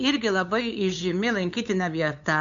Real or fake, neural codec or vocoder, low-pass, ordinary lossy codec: fake; codec, 16 kHz, 8 kbps, FunCodec, trained on LibriTTS, 25 frames a second; 7.2 kHz; MP3, 64 kbps